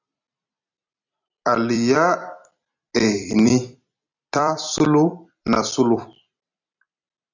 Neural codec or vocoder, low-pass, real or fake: vocoder, 44.1 kHz, 128 mel bands every 256 samples, BigVGAN v2; 7.2 kHz; fake